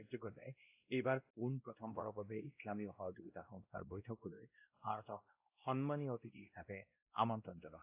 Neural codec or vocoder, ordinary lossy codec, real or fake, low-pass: codec, 16 kHz, 1 kbps, X-Codec, WavLM features, trained on Multilingual LibriSpeech; AAC, 32 kbps; fake; 3.6 kHz